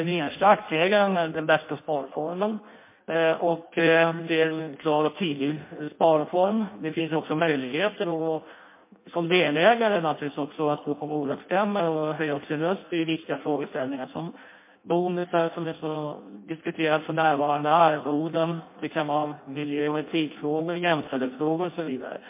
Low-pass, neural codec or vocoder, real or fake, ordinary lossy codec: 3.6 kHz; codec, 16 kHz in and 24 kHz out, 0.6 kbps, FireRedTTS-2 codec; fake; MP3, 24 kbps